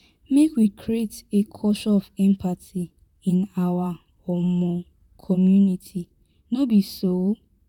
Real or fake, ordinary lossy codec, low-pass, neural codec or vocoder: fake; none; 19.8 kHz; vocoder, 44.1 kHz, 128 mel bands, Pupu-Vocoder